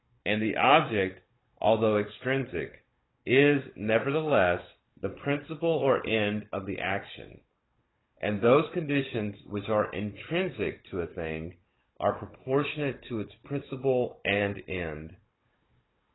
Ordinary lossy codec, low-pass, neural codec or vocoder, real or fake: AAC, 16 kbps; 7.2 kHz; codec, 16 kHz, 16 kbps, FunCodec, trained on Chinese and English, 50 frames a second; fake